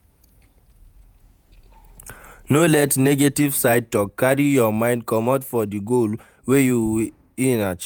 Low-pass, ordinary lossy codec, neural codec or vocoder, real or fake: none; none; vocoder, 48 kHz, 128 mel bands, Vocos; fake